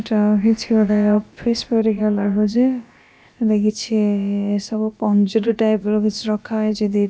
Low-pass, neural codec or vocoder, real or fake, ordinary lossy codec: none; codec, 16 kHz, about 1 kbps, DyCAST, with the encoder's durations; fake; none